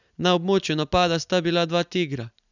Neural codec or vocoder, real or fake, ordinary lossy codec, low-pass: none; real; none; 7.2 kHz